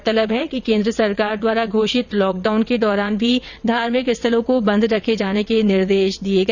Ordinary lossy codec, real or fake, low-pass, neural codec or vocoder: none; fake; 7.2 kHz; vocoder, 22.05 kHz, 80 mel bands, WaveNeXt